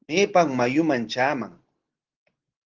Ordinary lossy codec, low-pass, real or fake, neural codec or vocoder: Opus, 16 kbps; 7.2 kHz; real; none